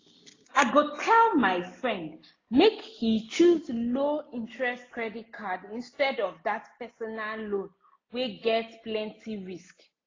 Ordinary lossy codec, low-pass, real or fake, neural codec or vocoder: AAC, 32 kbps; 7.2 kHz; real; none